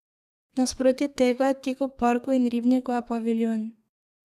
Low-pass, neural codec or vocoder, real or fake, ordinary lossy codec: 14.4 kHz; codec, 32 kHz, 1.9 kbps, SNAC; fake; none